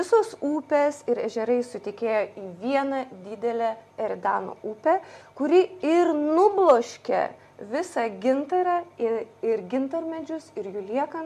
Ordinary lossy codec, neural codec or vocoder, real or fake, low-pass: MP3, 64 kbps; none; real; 14.4 kHz